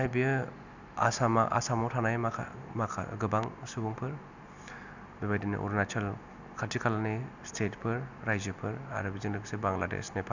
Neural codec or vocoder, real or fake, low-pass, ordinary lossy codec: none; real; 7.2 kHz; none